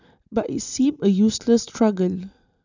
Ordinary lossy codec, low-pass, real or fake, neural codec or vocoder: none; 7.2 kHz; real; none